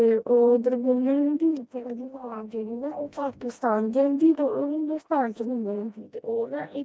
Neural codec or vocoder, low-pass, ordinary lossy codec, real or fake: codec, 16 kHz, 1 kbps, FreqCodec, smaller model; none; none; fake